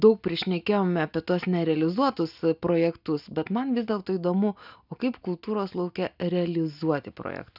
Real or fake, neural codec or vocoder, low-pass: real; none; 5.4 kHz